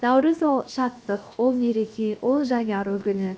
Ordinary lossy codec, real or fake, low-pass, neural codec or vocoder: none; fake; none; codec, 16 kHz, 0.7 kbps, FocalCodec